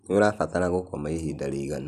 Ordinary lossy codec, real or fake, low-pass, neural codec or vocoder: none; real; 14.4 kHz; none